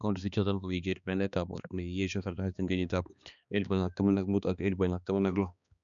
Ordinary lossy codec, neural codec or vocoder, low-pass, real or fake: none; codec, 16 kHz, 2 kbps, X-Codec, HuBERT features, trained on balanced general audio; 7.2 kHz; fake